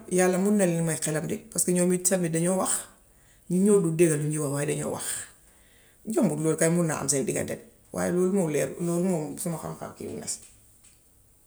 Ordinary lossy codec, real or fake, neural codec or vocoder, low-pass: none; real; none; none